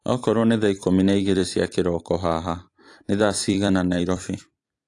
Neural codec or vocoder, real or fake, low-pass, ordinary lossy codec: vocoder, 44.1 kHz, 128 mel bands every 256 samples, BigVGAN v2; fake; 10.8 kHz; AAC, 48 kbps